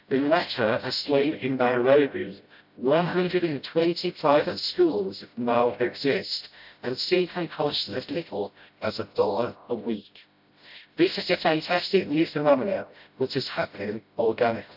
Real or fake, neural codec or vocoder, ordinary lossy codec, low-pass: fake; codec, 16 kHz, 0.5 kbps, FreqCodec, smaller model; AAC, 48 kbps; 5.4 kHz